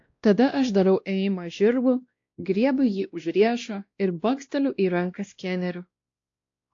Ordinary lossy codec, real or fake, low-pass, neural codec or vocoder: AAC, 48 kbps; fake; 7.2 kHz; codec, 16 kHz, 1 kbps, X-Codec, WavLM features, trained on Multilingual LibriSpeech